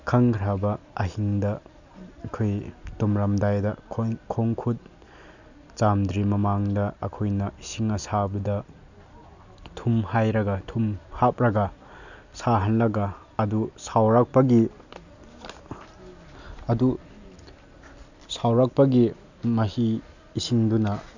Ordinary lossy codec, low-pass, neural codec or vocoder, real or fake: none; 7.2 kHz; none; real